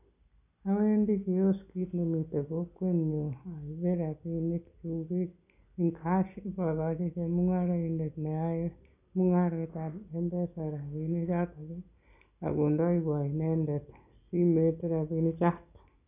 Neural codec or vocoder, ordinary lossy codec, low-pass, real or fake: none; none; 3.6 kHz; real